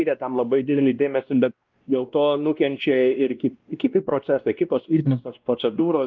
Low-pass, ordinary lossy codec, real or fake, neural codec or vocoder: 7.2 kHz; Opus, 32 kbps; fake; codec, 16 kHz, 1 kbps, X-Codec, WavLM features, trained on Multilingual LibriSpeech